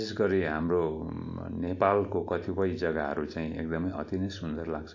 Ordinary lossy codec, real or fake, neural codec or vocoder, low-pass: AAC, 48 kbps; real; none; 7.2 kHz